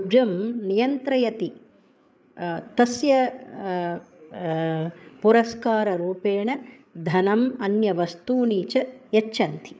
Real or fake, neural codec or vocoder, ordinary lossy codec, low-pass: fake; codec, 16 kHz, 8 kbps, FreqCodec, larger model; none; none